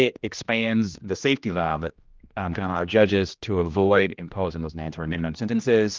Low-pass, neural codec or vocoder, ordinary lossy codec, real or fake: 7.2 kHz; codec, 16 kHz, 1 kbps, X-Codec, HuBERT features, trained on general audio; Opus, 32 kbps; fake